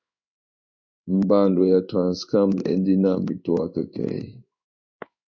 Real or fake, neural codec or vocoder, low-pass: fake; codec, 16 kHz in and 24 kHz out, 1 kbps, XY-Tokenizer; 7.2 kHz